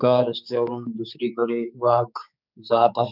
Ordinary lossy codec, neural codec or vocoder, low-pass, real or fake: none; codec, 16 kHz, 4 kbps, X-Codec, HuBERT features, trained on general audio; 5.4 kHz; fake